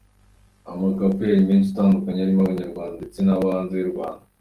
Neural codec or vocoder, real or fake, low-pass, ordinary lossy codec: none; real; 14.4 kHz; Opus, 24 kbps